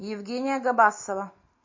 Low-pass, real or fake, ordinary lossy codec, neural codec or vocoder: 7.2 kHz; real; MP3, 32 kbps; none